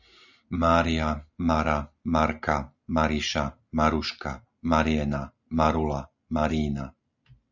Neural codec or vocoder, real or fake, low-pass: none; real; 7.2 kHz